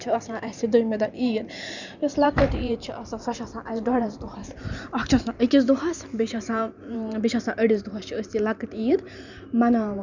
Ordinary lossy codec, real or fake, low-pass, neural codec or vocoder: none; fake; 7.2 kHz; vocoder, 44.1 kHz, 128 mel bands every 512 samples, BigVGAN v2